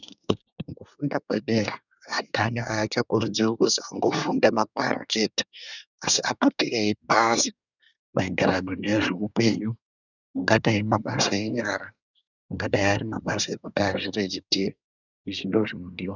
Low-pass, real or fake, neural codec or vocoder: 7.2 kHz; fake; codec, 24 kHz, 1 kbps, SNAC